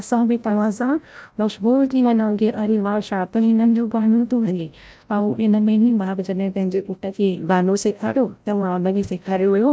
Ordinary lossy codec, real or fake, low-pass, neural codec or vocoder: none; fake; none; codec, 16 kHz, 0.5 kbps, FreqCodec, larger model